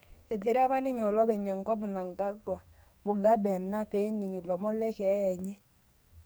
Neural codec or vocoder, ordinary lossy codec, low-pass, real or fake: codec, 44.1 kHz, 2.6 kbps, SNAC; none; none; fake